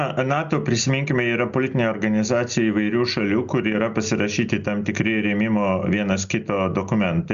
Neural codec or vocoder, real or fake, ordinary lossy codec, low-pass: none; real; Opus, 64 kbps; 7.2 kHz